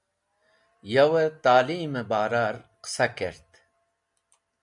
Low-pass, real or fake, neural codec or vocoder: 10.8 kHz; real; none